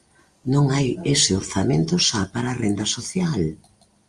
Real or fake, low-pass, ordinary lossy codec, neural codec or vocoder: real; 10.8 kHz; Opus, 32 kbps; none